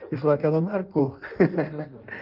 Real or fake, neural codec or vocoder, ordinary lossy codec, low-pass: fake; codec, 16 kHz in and 24 kHz out, 1.1 kbps, FireRedTTS-2 codec; Opus, 24 kbps; 5.4 kHz